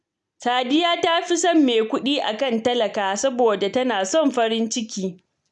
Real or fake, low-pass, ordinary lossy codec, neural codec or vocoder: real; 10.8 kHz; none; none